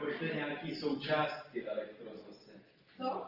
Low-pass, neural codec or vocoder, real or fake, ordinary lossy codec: 5.4 kHz; none; real; Opus, 24 kbps